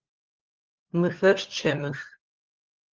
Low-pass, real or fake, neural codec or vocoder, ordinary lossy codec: 7.2 kHz; fake; codec, 16 kHz, 4 kbps, FunCodec, trained on LibriTTS, 50 frames a second; Opus, 16 kbps